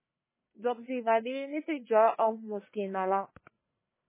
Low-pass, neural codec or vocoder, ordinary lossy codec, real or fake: 3.6 kHz; codec, 44.1 kHz, 1.7 kbps, Pupu-Codec; MP3, 16 kbps; fake